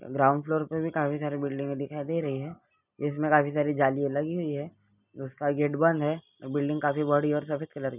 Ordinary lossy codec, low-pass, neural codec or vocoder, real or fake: none; 3.6 kHz; none; real